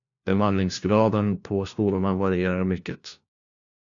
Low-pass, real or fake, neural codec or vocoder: 7.2 kHz; fake; codec, 16 kHz, 1 kbps, FunCodec, trained on LibriTTS, 50 frames a second